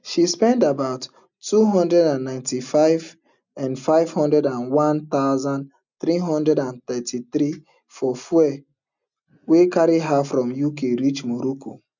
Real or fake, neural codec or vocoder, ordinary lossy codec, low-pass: real; none; none; 7.2 kHz